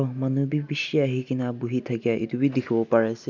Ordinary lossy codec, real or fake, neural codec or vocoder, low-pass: none; real; none; 7.2 kHz